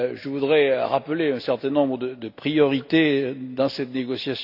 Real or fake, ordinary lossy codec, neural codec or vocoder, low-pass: real; none; none; 5.4 kHz